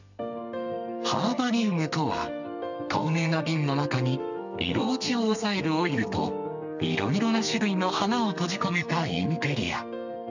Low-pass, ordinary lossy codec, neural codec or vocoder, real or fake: 7.2 kHz; none; codec, 32 kHz, 1.9 kbps, SNAC; fake